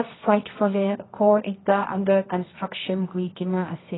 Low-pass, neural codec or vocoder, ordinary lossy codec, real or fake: 7.2 kHz; codec, 24 kHz, 0.9 kbps, WavTokenizer, medium music audio release; AAC, 16 kbps; fake